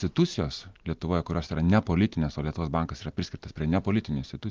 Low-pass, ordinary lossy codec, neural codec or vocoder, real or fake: 7.2 kHz; Opus, 24 kbps; none; real